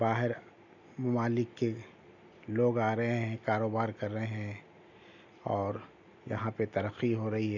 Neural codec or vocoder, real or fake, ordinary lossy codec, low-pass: none; real; none; 7.2 kHz